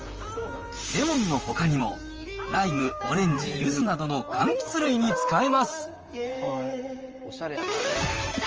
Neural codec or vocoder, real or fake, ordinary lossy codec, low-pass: vocoder, 44.1 kHz, 128 mel bands, Pupu-Vocoder; fake; Opus, 24 kbps; 7.2 kHz